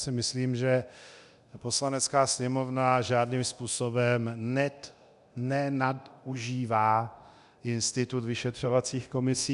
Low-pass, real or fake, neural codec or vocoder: 10.8 kHz; fake; codec, 24 kHz, 0.9 kbps, DualCodec